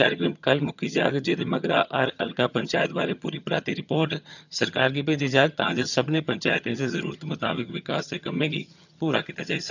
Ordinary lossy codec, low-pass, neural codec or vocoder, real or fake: none; 7.2 kHz; vocoder, 22.05 kHz, 80 mel bands, HiFi-GAN; fake